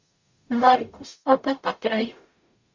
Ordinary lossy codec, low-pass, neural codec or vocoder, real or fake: Opus, 64 kbps; 7.2 kHz; codec, 44.1 kHz, 0.9 kbps, DAC; fake